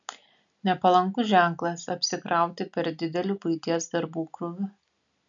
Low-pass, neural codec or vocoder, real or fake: 7.2 kHz; none; real